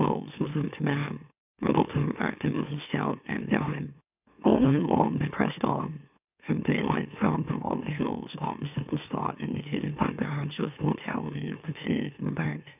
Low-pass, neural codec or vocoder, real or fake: 3.6 kHz; autoencoder, 44.1 kHz, a latent of 192 numbers a frame, MeloTTS; fake